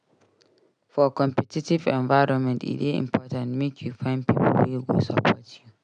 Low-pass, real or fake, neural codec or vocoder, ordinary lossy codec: 9.9 kHz; real; none; none